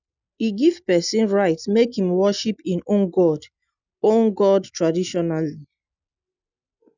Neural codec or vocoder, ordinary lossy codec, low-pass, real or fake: none; none; 7.2 kHz; real